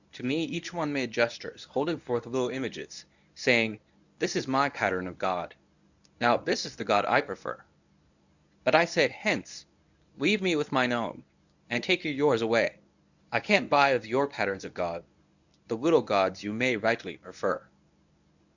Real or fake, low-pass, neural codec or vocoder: fake; 7.2 kHz; codec, 24 kHz, 0.9 kbps, WavTokenizer, medium speech release version 1